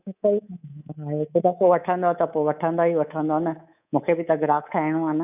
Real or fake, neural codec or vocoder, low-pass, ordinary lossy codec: fake; codec, 24 kHz, 3.1 kbps, DualCodec; 3.6 kHz; none